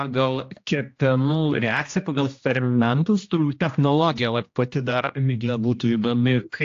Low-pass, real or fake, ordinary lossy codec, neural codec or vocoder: 7.2 kHz; fake; AAC, 48 kbps; codec, 16 kHz, 1 kbps, X-Codec, HuBERT features, trained on general audio